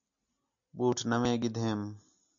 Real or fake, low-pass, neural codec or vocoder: real; 7.2 kHz; none